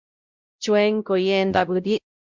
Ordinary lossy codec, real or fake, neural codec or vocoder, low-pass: Opus, 64 kbps; fake; codec, 16 kHz, 0.5 kbps, X-Codec, WavLM features, trained on Multilingual LibriSpeech; 7.2 kHz